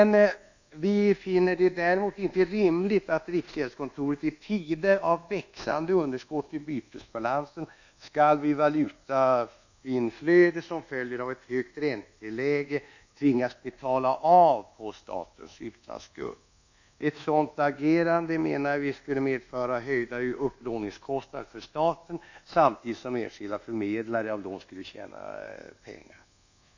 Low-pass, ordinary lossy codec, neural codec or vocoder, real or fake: 7.2 kHz; none; codec, 24 kHz, 1.2 kbps, DualCodec; fake